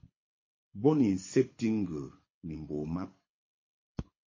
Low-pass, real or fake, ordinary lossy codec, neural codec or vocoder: 7.2 kHz; fake; MP3, 32 kbps; codec, 24 kHz, 6 kbps, HILCodec